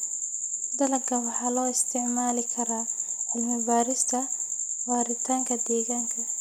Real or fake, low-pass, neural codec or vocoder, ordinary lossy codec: real; none; none; none